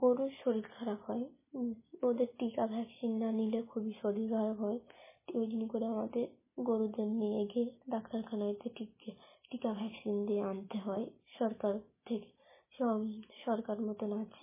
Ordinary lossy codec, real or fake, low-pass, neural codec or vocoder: MP3, 16 kbps; real; 3.6 kHz; none